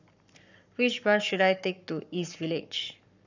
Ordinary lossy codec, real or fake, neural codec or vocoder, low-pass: none; fake; codec, 44.1 kHz, 7.8 kbps, Pupu-Codec; 7.2 kHz